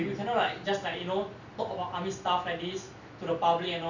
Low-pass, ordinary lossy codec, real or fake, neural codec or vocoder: 7.2 kHz; none; real; none